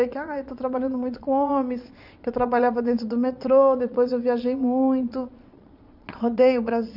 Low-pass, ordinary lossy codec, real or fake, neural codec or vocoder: 5.4 kHz; none; fake; vocoder, 22.05 kHz, 80 mel bands, Vocos